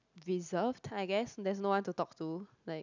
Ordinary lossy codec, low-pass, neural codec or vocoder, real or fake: none; 7.2 kHz; none; real